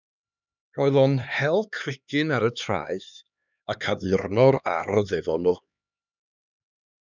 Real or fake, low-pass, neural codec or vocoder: fake; 7.2 kHz; codec, 16 kHz, 2 kbps, X-Codec, HuBERT features, trained on LibriSpeech